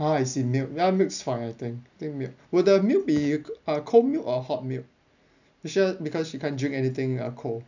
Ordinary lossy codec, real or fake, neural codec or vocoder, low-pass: none; real; none; 7.2 kHz